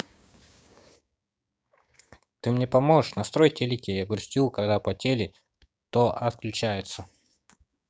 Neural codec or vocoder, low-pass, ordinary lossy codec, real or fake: codec, 16 kHz, 6 kbps, DAC; none; none; fake